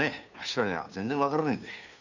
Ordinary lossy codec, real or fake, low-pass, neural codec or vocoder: none; fake; 7.2 kHz; codec, 16 kHz, 2 kbps, FunCodec, trained on Chinese and English, 25 frames a second